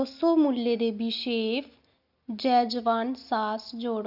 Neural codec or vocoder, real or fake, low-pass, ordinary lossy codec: none; real; 5.4 kHz; none